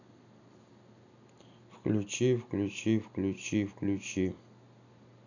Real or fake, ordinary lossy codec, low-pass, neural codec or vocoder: real; none; 7.2 kHz; none